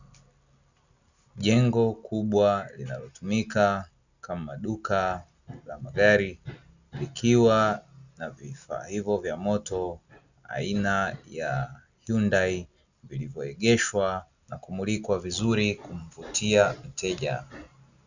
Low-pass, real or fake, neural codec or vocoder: 7.2 kHz; real; none